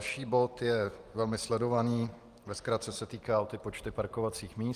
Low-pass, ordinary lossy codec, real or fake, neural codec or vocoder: 14.4 kHz; Opus, 32 kbps; real; none